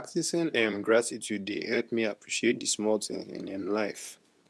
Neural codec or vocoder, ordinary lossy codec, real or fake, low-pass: codec, 24 kHz, 0.9 kbps, WavTokenizer, medium speech release version 2; none; fake; none